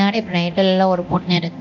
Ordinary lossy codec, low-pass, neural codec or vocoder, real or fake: none; 7.2 kHz; codec, 24 kHz, 0.9 kbps, DualCodec; fake